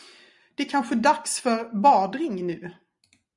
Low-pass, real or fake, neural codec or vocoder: 10.8 kHz; real; none